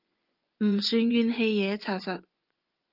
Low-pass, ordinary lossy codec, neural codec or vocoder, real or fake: 5.4 kHz; Opus, 24 kbps; vocoder, 24 kHz, 100 mel bands, Vocos; fake